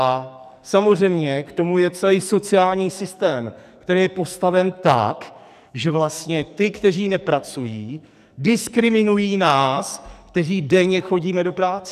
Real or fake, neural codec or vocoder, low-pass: fake; codec, 44.1 kHz, 2.6 kbps, SNAC; 14.4 kHz